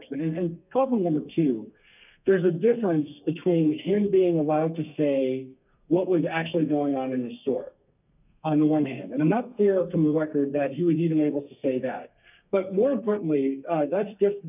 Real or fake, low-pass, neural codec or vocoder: fake; 3.6 kHz; codec, 32 kHz, 1.9 kbps, SNAC